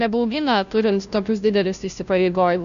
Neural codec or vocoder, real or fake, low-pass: codec, 16 kHz, 0.5 kbps, FunCodec, trained on Chinese and English, 25 frames a second; fake; 7.2 kHz